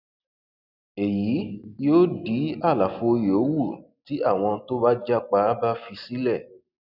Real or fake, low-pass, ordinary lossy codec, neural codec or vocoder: real; 5.4 kHz; none; none